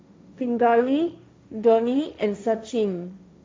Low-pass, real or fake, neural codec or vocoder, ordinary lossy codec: none; fake; codec, 16 kHz, 1.1 kbps, Voila-Tokenizer; none